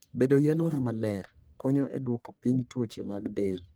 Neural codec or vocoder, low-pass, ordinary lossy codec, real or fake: codec, 44.1 kHz, 1.7 kbps, Pupu-Codec; none; none; fake